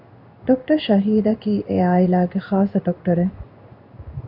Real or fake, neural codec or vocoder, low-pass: fake; codec, 16 kHz in and 24 kHz out, 1 kbps, XY-Tokenizer; 5.4 kHz